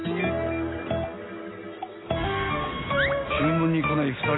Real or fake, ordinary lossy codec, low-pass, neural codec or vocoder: real; AAC, 16 kbps; 7.2 kHz; none